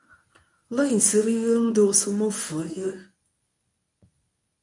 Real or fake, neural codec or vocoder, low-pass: fake; codec, 24 kHz, 0.9 kbps, WavTokenizer, medium speech release version 1; 10.8 kHz